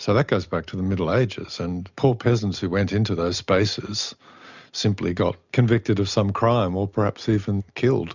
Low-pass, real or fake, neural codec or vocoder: 7.2 kHz; real; none